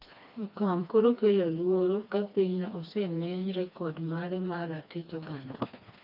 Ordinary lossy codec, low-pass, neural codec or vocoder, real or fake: none; 5.4 kHz; codec, 16 kHz, 2 kbps, FreqCodec, smaller model; fake